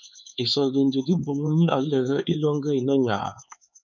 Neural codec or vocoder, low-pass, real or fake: codec, 16 kHz, 4 kbps, X-Codec, HuBERT features, trained on LibriSpeech; 7.2 kHz; fake